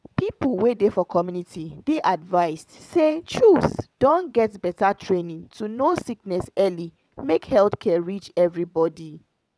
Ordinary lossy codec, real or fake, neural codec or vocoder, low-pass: none; fake; vocoder, 22.05 kHz, 80 mel bands, WaveNeXt; none